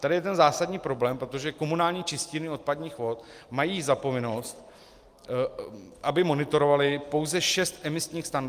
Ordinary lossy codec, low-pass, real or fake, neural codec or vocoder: Opus, 32 kbps; 14.4 kHz; real; none